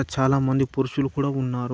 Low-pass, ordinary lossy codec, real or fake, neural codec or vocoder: none; none; real; none